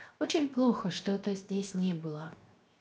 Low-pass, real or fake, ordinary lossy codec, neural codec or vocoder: none; fake; none; codec, 16 kHz, 0.7 kbps, FocalCodec